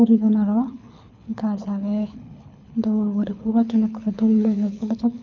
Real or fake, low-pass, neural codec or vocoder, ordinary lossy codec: fake; 7.2 kHz; codec, 24 kHz, 6 kbps, HILCodec; none